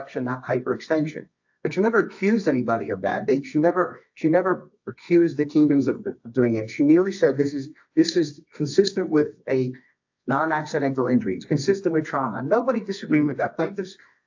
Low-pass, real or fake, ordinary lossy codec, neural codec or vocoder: 7.2 kHz; fake; AAC, 48 kbps; codec, 24 kHz, 0.9 kbps, WavTokenizer, medium music audio release